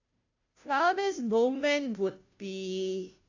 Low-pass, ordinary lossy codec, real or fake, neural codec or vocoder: 7.2 kHz; AAC, 48 kbps; fake; codec, 16 kHz, 0.5 kbps, FunCodec, trained on Chinese and English, 25 frames a second